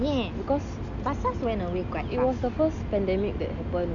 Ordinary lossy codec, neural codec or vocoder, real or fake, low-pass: none; none; real; 7.2 kHz